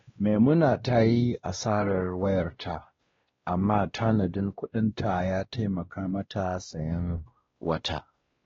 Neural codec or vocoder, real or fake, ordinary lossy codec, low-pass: codec, 16 kHz, 1 kbps, X-Codec, WavLM features, trained on Multilingual LibriSpeech; fake; AAC, 24 kbps; 7.2 kHz